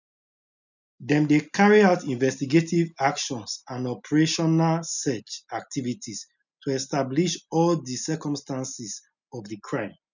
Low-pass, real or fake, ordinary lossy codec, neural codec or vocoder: 7.2 kHz; real; none; none